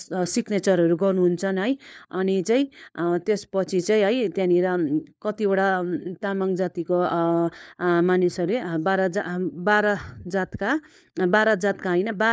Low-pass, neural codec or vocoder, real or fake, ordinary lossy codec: none; codec, 16 kHz, 4 kbps, FunCodec, trained on LibriTTS, 50 frames a second; fake; none